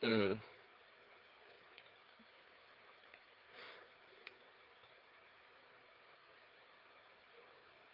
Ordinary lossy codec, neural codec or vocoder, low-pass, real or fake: Opus, 32 kbps; codec, 16 kHz, 4 kbps, FreqCodec, larger model; 5.4 kHz; fake